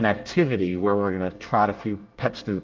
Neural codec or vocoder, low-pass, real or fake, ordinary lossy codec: codec, 24 kHz, 1 kbps, SNAC; 7.2 kHz; fake; Opus, 24 kbps